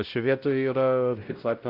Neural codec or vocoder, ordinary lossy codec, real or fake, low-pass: codec, 16 kHz, 0.5 kbps, X-Codec, WavLM features, trained on Multilingual LibriSpeech; Opus, 24 kbps; fake; 5.4 kHz